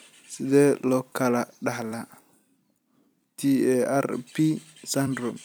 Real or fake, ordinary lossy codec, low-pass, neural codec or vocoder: fake; none; none; vocoder, 44.1 kHz, 128 mel bands every 256 samples, BigVGAN v2